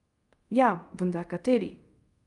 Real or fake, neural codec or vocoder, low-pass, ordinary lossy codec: fake; codec, 24 kHz, 0.5 kbps, DualCodec; 10.8 kHz; Opus, 32 kbps